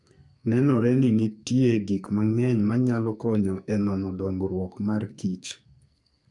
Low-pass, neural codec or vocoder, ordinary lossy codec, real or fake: 10.8 kHz; codec, 44.1 kHz, 2.6 kbps, SNAC; none; fake